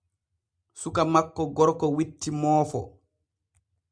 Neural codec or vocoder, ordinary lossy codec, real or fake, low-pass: none; Opus, 64 kbps; real; 9.9 kHz